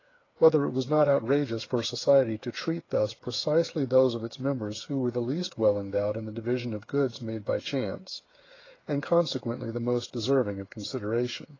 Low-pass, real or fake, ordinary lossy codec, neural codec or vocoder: 7.2 kHz; fake; AAC, 32 kbps; codec, 16 kHz, 8 kbps, FreqCodec, smaller model